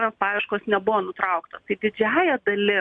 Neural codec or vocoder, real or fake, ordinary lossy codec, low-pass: none; real; MP3, 64 kbps; 9.9 kHz